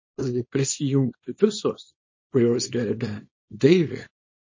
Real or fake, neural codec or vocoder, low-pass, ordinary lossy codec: fake; codec, 24 kHz, 0.9 kbps, WavTokenizer, small release; 7.2 kHz; MP3, 32 kbps